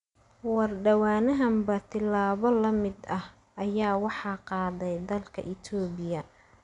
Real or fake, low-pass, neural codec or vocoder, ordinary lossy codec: real; 10.8 kHz; none; none